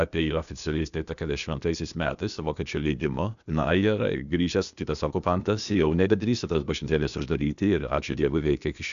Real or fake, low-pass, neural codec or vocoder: fake; 7.2 kHz; codec, 16 kHz, 0.8 kbps, ZipCodec